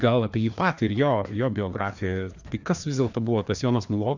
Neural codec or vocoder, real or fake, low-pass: codec, 16 kHz, 2 kbps, FreqCodec, larger model; fake; 7.2 kHz